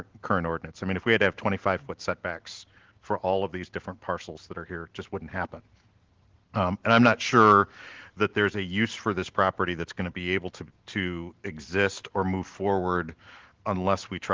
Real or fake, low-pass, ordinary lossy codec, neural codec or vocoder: real; 7.2 kHz; Opus, 16 kbps; none